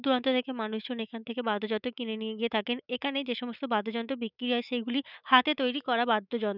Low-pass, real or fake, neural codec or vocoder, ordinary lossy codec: 5.4 kHz; fake; autoencoder, 48 kHz, 128 numbers a frame, DAC-VAE, trained on Japanese speech; none